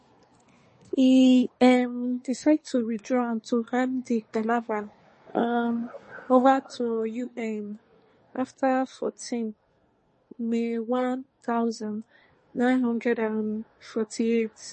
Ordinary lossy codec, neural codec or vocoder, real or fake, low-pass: MP3, 32 kbps; codec, 24 kHz, 1 kbps, SNAC; fake; 10.8 kHz